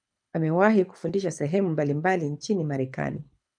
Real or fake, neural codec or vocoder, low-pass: fake; codec, 24 kHz, 6 kbps, HILCodec; 9.9 kHz